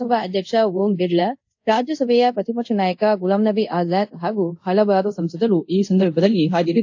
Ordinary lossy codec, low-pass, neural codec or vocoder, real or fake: AAC, 48 kbps; 7.2 kHz; codec, 24 kHz, 0.5 kbps, DualCodec; fake